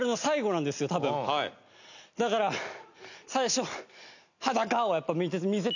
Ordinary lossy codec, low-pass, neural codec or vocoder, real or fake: none; 7.2 kHz; none; real